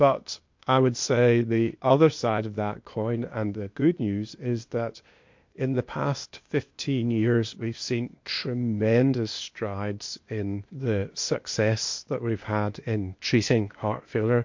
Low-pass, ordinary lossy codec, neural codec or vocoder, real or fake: 7.2 kHz; MP3, 48 kbps; codec, 16 kHz, 0.8 kbps, ZipCodec; fake